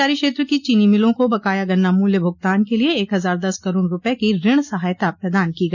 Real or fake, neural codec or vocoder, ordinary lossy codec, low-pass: real; none; none; 7.2 kHz